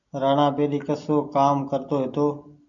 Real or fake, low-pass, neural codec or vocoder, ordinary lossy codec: real; 7.2 kHz; none; AAC, 64 kbps